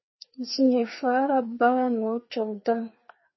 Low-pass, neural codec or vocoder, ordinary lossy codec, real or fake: 7.2 kHz; codec, 16 kHz, 2 kbps, FreqCodec, larger model; MP3, 24 kbps; fake